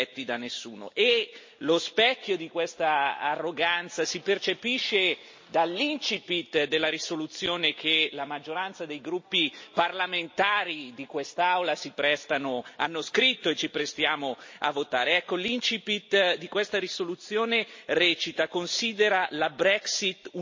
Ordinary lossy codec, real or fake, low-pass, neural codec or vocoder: MP3, 32 kbps; real; 7.2 kHz; none